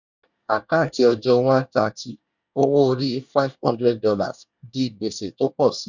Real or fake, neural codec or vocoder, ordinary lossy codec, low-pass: fake; codec, 24 kHz, 1 kbps, SNAC; none; 7.2 kHz